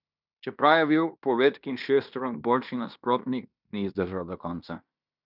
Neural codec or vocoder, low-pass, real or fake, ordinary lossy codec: codec, 16 kHz in and 24 kHz out, 0.9 kbps, LongCat-Audio-Codec, fine tuned four codebook decoder; 5.4 kHz; fake; none